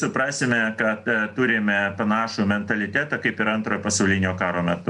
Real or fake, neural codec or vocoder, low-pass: real; none; 10.8 kHz